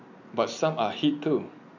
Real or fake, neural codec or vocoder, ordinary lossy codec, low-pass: real; none; none; 7.2 kHz